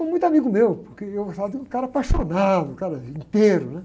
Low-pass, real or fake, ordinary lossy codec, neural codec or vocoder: none; real; none; none